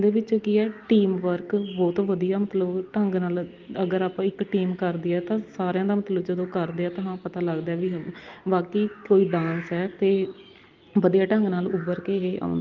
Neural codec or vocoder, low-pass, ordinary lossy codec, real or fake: none; 7.2 kHz; Opus, 32 kbps; real